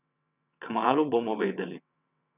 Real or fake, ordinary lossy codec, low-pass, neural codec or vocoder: fake; none; 3.6 kHz; vocoder, 22.05 kHz, 80 mel bands, WaveNeXt